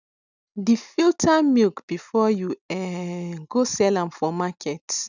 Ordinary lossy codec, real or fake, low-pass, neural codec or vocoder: none; real; 7.2 kHz; none